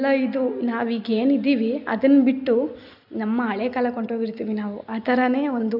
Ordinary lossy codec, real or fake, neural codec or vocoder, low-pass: AAC, 48 kbps; real; none; 5.4 kHz